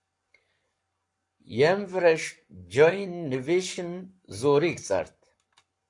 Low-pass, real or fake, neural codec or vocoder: 10.8 kHz; fake; codec, 44.1 kHz, 7.8 kbps, Pupu-Codec